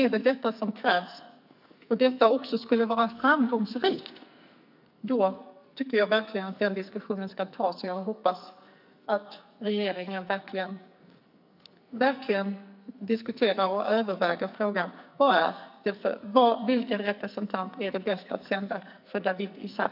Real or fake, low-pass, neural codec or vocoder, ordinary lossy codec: fake; 5.4 kHz; codec, 44.1 kHz, 2.6 kbps, SNAC; none